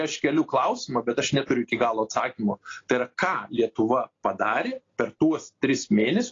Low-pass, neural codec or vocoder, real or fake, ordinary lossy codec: 7.2 kHz; none; real; AAC, 32 kbps